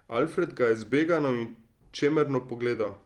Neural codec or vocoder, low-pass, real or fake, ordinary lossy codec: vocoder, 44.1 kHz, 128 mel bands every 256 samples, BigVGAN v2; 19.8 kHz; fake; Opus, 24 kbps